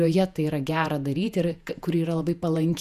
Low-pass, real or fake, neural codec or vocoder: 14.4 kHz; fake; vocoder, 48 kHz, 128 mel bands, Vocos